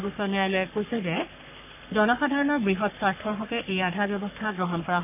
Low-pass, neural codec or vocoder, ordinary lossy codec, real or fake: 3.6 kHz; codec, 44.1 kHz, 3.4 kbps, Pupu-Codec; none; fake